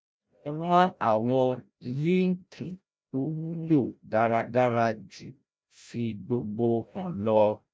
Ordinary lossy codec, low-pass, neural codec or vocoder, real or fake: none; none; codec, 16 kHz, 0.5 kbps, FreqCodec, larger model; fake